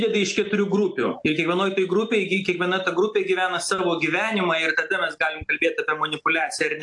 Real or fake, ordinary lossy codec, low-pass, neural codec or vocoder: real; AAC, 64 kbps; 10.8 kHz; none